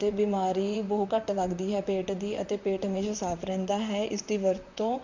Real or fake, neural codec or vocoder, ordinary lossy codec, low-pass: fake; vocoder, 44.1 kHz, 128 mel bands, Pupu-Vocoder; none; 7.2 kHz